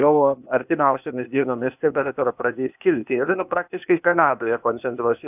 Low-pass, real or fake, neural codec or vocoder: 3.6 kHz; fake; codec, 16 kHz, 0.8 kbps, ZipCodec